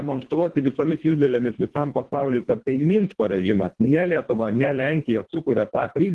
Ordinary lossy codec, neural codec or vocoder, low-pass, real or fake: Opus, 16 kbps; codec, 24 kHz, 1.5 kbps, HILCodec; 10.8 kHz; fake